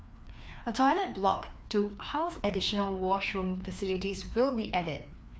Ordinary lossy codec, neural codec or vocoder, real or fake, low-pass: none; codec, 16 kHz, 2 kbps, FreqCodec, larger model; fake; none